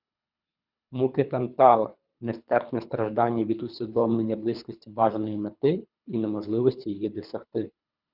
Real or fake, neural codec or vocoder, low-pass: fake; codec, 24 kHz, 3 kbps, HILCodec; 5.4 kHz